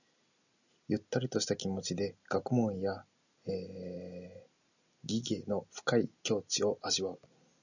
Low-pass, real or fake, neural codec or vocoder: 7.2 kHz; real; none